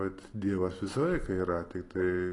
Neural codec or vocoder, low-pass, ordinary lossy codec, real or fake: none; 10.8 kHz; AAC, 32 kbps; real